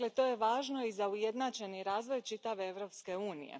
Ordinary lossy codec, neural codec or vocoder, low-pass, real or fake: none; none; none; real